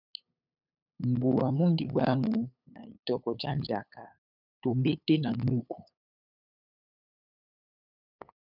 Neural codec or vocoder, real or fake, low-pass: codec, 16 kHz, 8 kbps, FunCodec, trained on LibriTTS, 25 frames a second; fake; 5.4 kHz